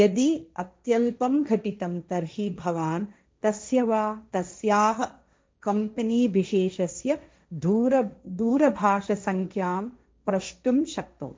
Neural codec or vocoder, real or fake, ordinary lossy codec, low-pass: codec, 16 kHz, 1.1 kbps, Voila-Tokenizer; fake; none; none